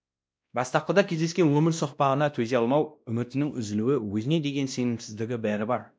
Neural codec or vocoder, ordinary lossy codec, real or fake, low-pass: codec, 16 kHz, 1 kbps, X-Codec, WavLM features, trained on Multilingual LibriSpeech; none; fake; none